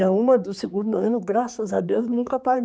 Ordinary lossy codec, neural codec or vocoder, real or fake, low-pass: none; codec, 16 kHz, 4 kbps, X-Codec, HuBERT features, trained on general audio; fake; none